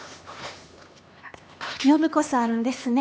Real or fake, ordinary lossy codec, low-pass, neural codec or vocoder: fake; none; none; codec, 16 kHz, 2 kbps, X-Codec, HuBERT features, trained on LibriSpeech